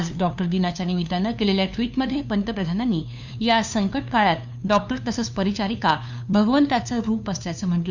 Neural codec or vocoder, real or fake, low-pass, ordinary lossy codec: codec, 16 kHz, 2 kbps, FunCodec, trained on LibriTTS, 25 frames a second; fake; 7.2 kHz; none